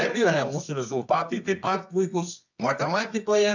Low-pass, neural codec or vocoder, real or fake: 7.2 kHz; codec, 24 kHz, 0.9 kbps, WavTokenizer, medium music audio release; fake